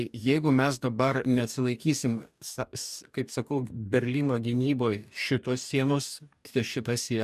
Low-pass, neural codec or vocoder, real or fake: 14.4 kHz; codec, 44.1 kHz, 2.6 kbps, DAC; fake